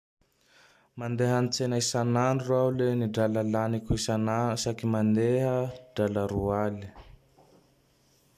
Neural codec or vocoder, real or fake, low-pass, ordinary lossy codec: none; real; 14.4 kHz; none